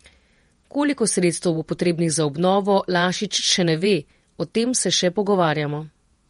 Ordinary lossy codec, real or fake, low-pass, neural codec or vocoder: MP3, 48 kbps; fake; 19.8 kHz; vocoder, 48 kHz, 128 mel bands, Vocos